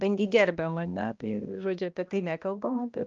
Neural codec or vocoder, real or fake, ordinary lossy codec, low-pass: codec, 16 kHz, 1 kbps, X-Codec, HuBERT features, trained on balanced general audio; fake; Opus, 24 kbps; 7.2 kHz